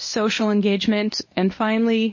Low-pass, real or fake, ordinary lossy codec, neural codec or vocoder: 7.2 kHz; fake; MP3, 32 kbps; codec, 24 kHz, 0.9 kbps, WavTokenizer, medium speech release version 1